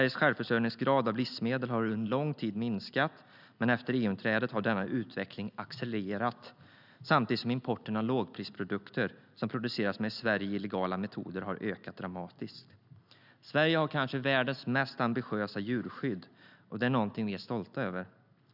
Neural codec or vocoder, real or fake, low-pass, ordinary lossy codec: none; real; 5.4 kHz; none